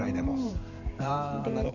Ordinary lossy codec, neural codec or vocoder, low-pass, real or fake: none; vocoder, 44.1 kHz, 128 mel bands every 512 samples, BigVGAN v2; 7.2 kHz; fake